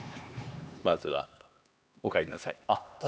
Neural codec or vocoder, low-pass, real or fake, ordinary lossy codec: codec, 16 kHz, 2 kbps, X-Codec, HuBERT features, trained on LibriSpeech; none; fake; none